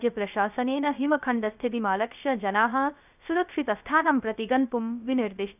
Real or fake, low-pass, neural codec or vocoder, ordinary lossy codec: fake; 3.6 kHz; codec, 16 kHz, 0.3 kbps, FocalCodec; none